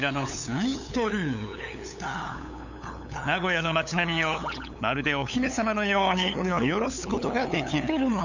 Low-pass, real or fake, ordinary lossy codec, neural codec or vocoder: 7.2 kHz; fake; none; codec, 16 kHz, 8 kbps, FunCodec, trained on LibriTTS, 25 frames a second